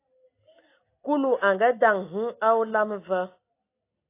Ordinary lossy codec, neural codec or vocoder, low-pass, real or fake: AAC, 24 kbps; none; 3.6 kHz; real